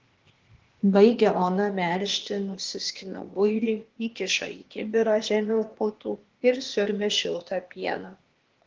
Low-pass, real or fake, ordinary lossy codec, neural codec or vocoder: 7.2 kHz; fake; Opus, 16 kbps; codec, 16 kHz, 0.8 kbps, ZipCodec